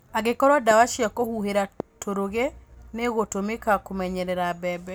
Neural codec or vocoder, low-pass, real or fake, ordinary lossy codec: none; none; real; none